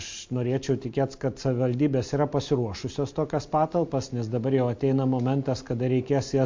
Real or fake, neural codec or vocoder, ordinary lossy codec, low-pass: real; none; MP3, 48 kbps; 7.2 kHz